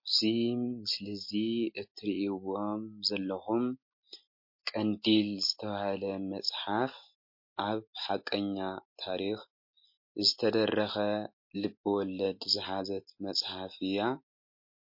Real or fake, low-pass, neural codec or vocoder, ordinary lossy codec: real; 5.4 kHz; none; MP3, 32 kbps